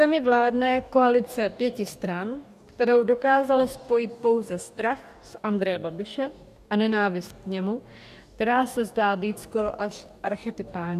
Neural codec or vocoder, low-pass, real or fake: codec, 44.1 kHz, 2.6 kbps, DAC; 14.4 kHz; fake